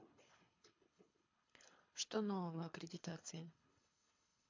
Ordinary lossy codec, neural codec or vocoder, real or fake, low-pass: MP3, 48 kbps; codec, 24 kHz, 3 kbps, HILCodec; fake; 7.2 kHz